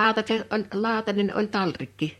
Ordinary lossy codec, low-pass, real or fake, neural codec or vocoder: MP3, 64 kbps; 19.8 kHz; fake; vocoder, 44.1 kHz, 128 mel bands, Pupu-Vocoder